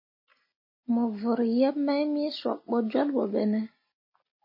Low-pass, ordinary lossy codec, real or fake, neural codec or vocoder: 5.4 kHz; MP3, 24 kbps; real; none